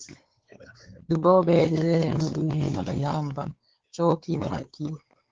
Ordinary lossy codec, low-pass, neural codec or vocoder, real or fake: Opus, 16 kbps; 7.2 kHz; codec, 16 kHz, 4 kbps, X-Codec, WavLM features, trained on Multilingual LibriSpeech; fake